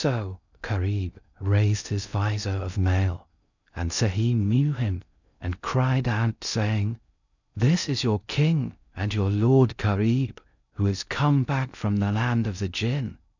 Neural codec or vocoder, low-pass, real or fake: codec, 16 kHz in and 24 kHz out, 0.6 kbps, FocalCodec, streaming, 2048 codes; 7.2 kHz; fake